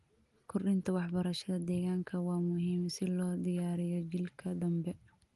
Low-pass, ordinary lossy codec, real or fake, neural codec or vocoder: 10.8 kHz; Opus, 24 kbps; real; none